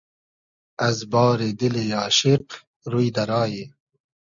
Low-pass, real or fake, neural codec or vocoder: 7.2 kHz; real; none